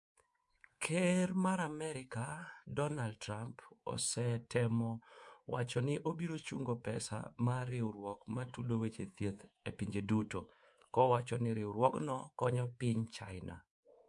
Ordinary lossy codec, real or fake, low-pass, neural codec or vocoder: MP3, 64 kbps; fake; 10.8 kHz; codec, 24 kHz, 3.1 kbps, DualCodec